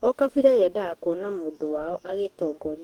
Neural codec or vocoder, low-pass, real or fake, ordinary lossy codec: codec, 44.1 kHz, 2.6 kbps, DAC; 19.8 kHz; fake; Opus, 32 kbps